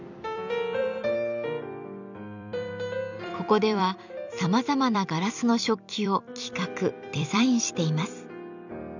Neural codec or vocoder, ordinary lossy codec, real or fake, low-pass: vocoder, 44.1 kHz, 128 mel bands every 256 samples, BigVGAN v2; none; fake; 7.2 kHz